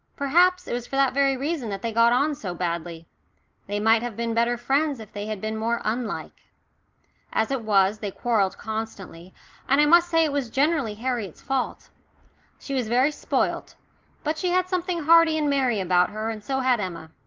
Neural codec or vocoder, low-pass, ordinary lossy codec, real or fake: none; 7.2 kHz; Opus, 32 kbps; real